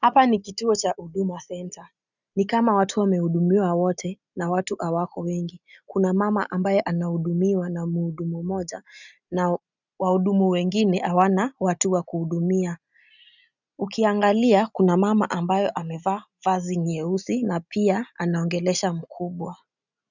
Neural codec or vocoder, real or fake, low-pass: none; real; 7.2 kHz